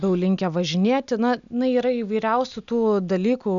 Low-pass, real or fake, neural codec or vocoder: 7.2 kHz; real; none